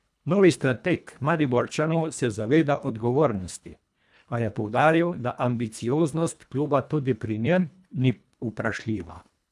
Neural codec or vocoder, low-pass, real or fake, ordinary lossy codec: codec, 24 kHz, 1.5 kbps, HILCodec; none; fake; none